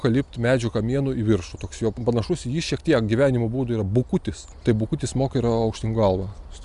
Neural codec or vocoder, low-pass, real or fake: none; 10.8 kHz; real